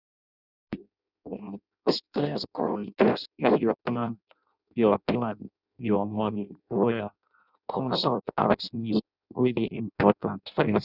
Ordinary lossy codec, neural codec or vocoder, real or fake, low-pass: none; codec, 16 kHz in and 24 kHz out, 0.6 kbps, FireRedTTS-2 codec; fake; 5.4 kHz